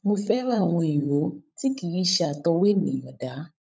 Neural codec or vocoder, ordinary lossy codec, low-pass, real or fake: codec, 16 kHz, 16 kbps, FunCodec, trained on LibriTTS, 50 frames a second; none; none; fake